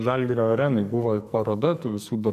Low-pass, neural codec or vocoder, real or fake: 14.4 kHz; codec, 44.1 kHz, 2.6 kbps, SNAC; fake